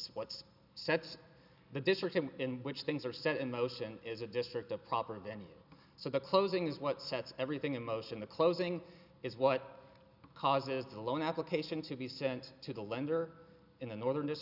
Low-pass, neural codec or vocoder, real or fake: 5.4 kHz; none; real